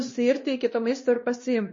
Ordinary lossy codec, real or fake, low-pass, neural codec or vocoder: MP3, 32 kbps; fake; 7.2 kHz; codec, 16 kHz, 1 kbps, X-Codec, WavLM features, trained on Multilingual LibriSpeech